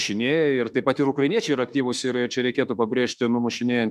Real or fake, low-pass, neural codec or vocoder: fake; 14.4 kHz; autoencoder, 48 kHz, 32 numbers a frame, DAC-VAE, trained on Japanese speech